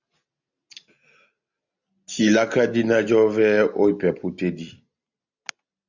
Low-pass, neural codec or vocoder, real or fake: 7.2 kHz; none; real